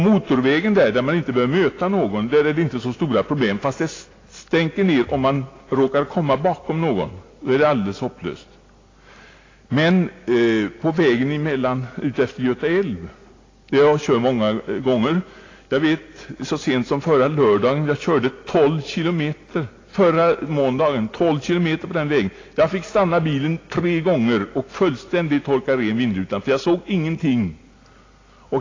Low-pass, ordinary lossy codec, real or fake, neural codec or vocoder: 7.2 kHz; AAC, 32 kbps; real; none